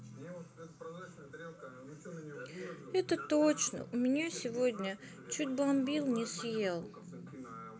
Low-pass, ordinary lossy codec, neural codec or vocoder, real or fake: none; none; none; real